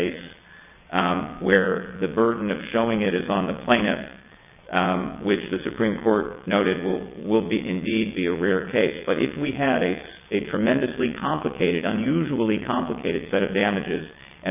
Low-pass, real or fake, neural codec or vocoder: 3.6 kHz; fake; vocoder, 22.05 kHz, 80 mel bands, WaveNeXt